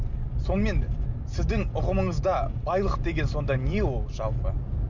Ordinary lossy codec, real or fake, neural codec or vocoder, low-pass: none; real; none; 7.2 kHz